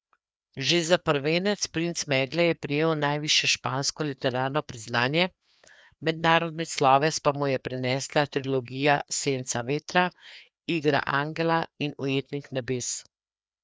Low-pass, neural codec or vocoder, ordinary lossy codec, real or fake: none; codec, 16 kHz, 2 kbps, FreqCodec, larger model; none; fake